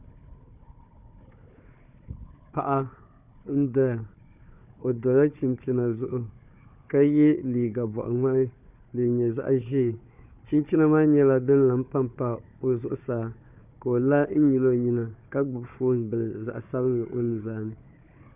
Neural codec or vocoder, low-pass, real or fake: codec, 16 kHz, 4 kbps, FunCodec, trained on Chinese and English, 50 frames a second; 3.6 kHz; fake